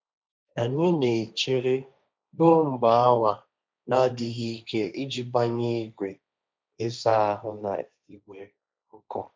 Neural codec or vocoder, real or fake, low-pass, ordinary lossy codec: codec, 16 kHz, 1.1 kbps, Voila-Tokenizer; fake; none; none